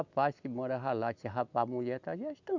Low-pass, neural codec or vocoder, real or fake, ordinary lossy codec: 7.2 kHz; none; real; none